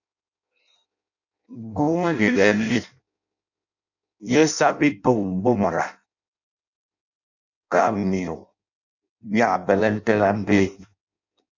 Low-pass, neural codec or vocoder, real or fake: 7.2 kHz; codec, 16 kHz in and 24 kHz out, 0.6 kbps, FireRedTTS-2 codec; fake